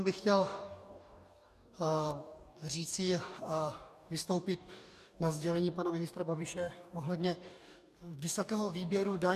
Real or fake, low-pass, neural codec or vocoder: fake; 14.4 kHz; codec, 44.1 kHz, 2.6 kbps, DAC